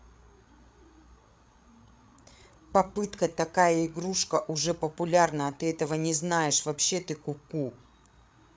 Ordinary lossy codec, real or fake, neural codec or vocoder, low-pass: none; fake; codec, 16 kHz, 8 kbps, FreqCodec, larger model; none